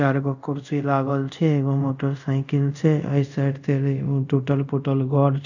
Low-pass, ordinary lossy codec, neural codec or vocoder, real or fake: 7.2 kHz; none; codec, 24 kHz, 0.5 kbps, DualCodec; fake